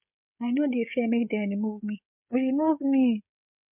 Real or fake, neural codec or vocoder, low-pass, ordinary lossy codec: fake; codec, 16 kHz, 16 kbps, FreqCodec, smaller model; 3.6 kHz; MP3, 32 kbps